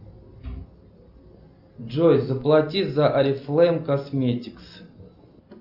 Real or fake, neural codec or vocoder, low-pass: real; none; 5.4 kHz